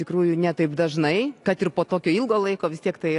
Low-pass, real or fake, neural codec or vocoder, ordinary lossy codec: 10.8 kHz; real; none; AAC, 48 kbps